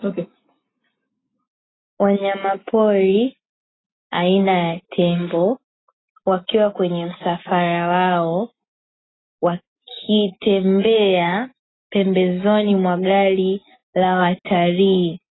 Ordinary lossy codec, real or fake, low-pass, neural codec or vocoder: AAC, 16 kbps; real; 7.2 kHz; none